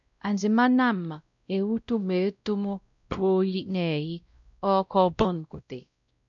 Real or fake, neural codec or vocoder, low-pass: fake; codec, 16 kHz, 0.5 kbps, X-Codec, WavLM features, trained on Multilingual LibriSpeech; 7.2 kHz